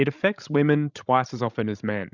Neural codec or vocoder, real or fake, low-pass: codec, 16 kHz, 16 kbps, FreqCodec, larger model; fake; 7.2 kHz